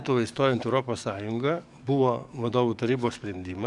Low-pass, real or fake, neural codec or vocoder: 10.8 kHz; fake; codec, 44.1 kHz, 7.8 kbps, DAC